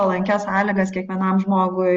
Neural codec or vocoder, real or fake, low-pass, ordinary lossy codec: none; real; 7.2 kHz; Opus, 24 kbps